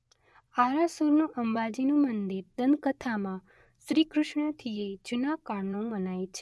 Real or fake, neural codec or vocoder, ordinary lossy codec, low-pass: fake; vocoder, 24 kHz, 100 mel bands, Vocos; none; none